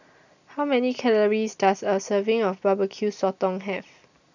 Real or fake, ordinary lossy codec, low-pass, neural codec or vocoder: real; none; 7.2 kHz; none